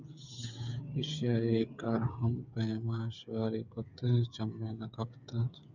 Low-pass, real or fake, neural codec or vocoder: 7.2 kHz; fake; codec, 16 kHz, 8 kbps, FreqCodec, smaller model